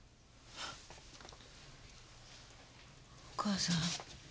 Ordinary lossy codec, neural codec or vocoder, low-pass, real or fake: none; none; none; real